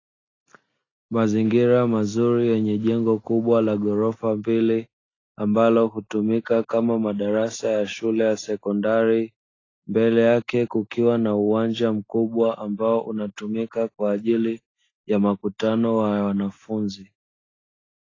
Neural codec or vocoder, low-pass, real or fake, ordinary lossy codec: none; 7.2 kHz; real; AAC, 32 kbps